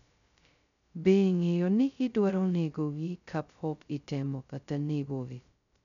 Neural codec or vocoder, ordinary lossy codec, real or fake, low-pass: codec, 16 kHz, 0.2 kbps, FocalCodec; none; fake; 7.2 kHz